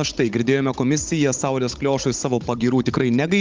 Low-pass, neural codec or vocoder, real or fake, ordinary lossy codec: 7.2 kHz; codec, 16 kHz, 16 kbps, FunCodec, trained on Chinese and English, 50 frames a second; fake; Opus, 24 kbps